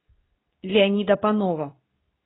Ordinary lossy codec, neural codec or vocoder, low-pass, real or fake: AAC, 16 kbps; codec, 24 kHz, 0.9 kbps, WavTokenizer, medium speech release version 2; 7.2 kHz; fake